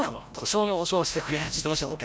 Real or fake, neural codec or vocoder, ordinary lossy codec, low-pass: fake; codec, 16 kHz, 0.5 kbps, FreqCodec, larger model; none; none